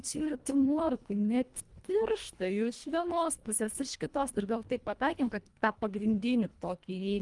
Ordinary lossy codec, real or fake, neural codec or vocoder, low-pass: Opus, 32 kbps; fake; codec, 24 kHz, 1.5 kbps, HILCodec; 10.8 kHz